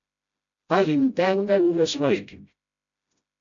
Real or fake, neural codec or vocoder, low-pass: fake; codec, 16 kHz, 0.5 kbps, FreqCodec, smaller model; 7.2 kHz